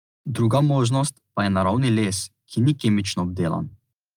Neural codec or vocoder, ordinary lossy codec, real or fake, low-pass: none; Opus, 32 kbps; real; 19.8 kHz